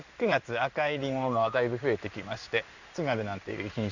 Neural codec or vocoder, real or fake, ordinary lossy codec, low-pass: codec, 16 kHz in and 24 kHz out, 1 kbps, XY-Tokenizer; fake; none; 7.2 kHz